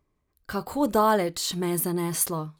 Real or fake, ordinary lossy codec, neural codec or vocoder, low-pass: real; none; none; none